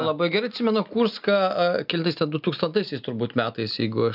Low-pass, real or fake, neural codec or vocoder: 5.4 kHz; real; none